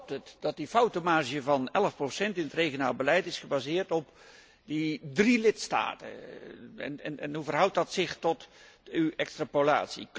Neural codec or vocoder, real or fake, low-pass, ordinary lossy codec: none; real; none; none